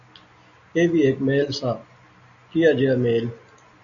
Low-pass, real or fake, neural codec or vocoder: 7.2 kHz; real; none